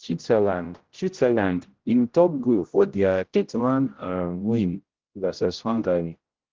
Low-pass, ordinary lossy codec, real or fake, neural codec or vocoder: 7.2 kHz; Opus, 16 kbps; fake; codec, 16 kHz, 0.5 kbps, X-Codec, HuBERT features, trained on general audio